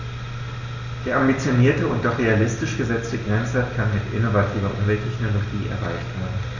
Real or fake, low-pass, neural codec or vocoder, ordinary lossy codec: real; 7.2 kHz; none; none